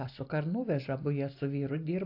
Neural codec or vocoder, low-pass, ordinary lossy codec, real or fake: none; 5.4 kHz; AAC, 32 kbps; real